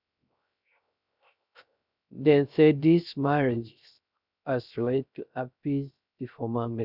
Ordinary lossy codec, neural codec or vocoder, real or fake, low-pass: none; codec, 16 kHz, 0.3 kbps, FocalCodec; fake; 5.4 kHz